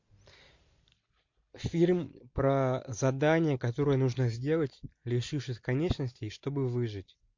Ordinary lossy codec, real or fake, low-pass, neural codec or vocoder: MP3, 48 kbps; real; 7.2 kHz; none